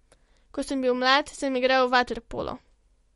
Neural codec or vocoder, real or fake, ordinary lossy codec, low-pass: none; real; MP3, 48 kbps; 19.8 kHz